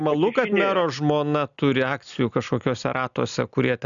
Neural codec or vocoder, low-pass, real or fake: none; 7.2 kHz; real